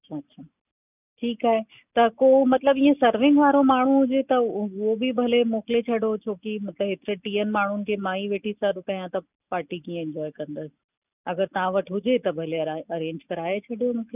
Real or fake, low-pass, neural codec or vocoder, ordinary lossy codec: real; 3.6 kHz; none; none